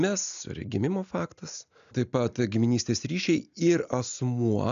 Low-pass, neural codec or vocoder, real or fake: 7.2 kHz; none; real